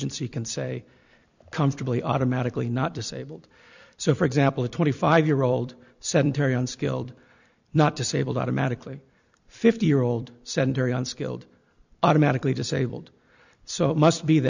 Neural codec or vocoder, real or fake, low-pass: vocoder, 44.1 kHz, 128 mel bands every 256 samples, BigVGAN v2; fake; 7.2 kHz